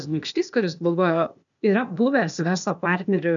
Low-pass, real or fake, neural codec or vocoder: 7.2 kHz; fake; codec, 16 kHz, 0.8 kbps, ZipCodec